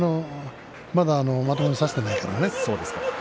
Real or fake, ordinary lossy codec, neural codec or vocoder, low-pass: real; none; none; none